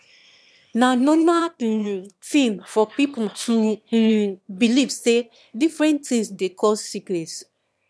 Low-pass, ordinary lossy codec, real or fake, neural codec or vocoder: none; none; fake; autoencoder, 22.05 kHz, a latent of 192 numbers a frame, VITS, trained on one speaker